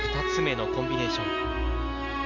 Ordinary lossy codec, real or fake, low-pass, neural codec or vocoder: none; real; 7.2 kHz; none